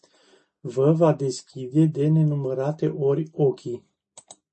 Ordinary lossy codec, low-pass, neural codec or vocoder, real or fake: MP3, 32 kbps; 10.8 kHz; none; real